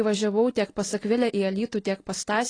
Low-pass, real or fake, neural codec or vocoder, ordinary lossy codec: 9.9 kHz; real; none; AAC, 32 kbps